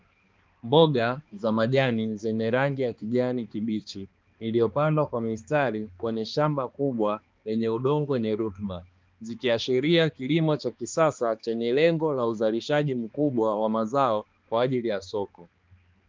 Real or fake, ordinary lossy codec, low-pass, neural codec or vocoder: fake; Opus, 32 kbps; 7.2 kHz; codec, 16 kHz, 2 kbps, X-Codec, HuBERT features, trained on balanced general audio